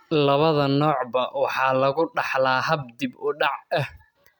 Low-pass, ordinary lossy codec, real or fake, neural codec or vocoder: 19.8 kHz; none; real; none